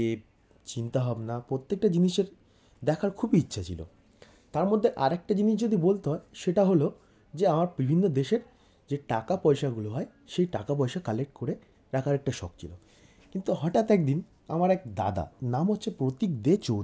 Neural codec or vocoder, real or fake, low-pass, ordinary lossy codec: none; real; none; none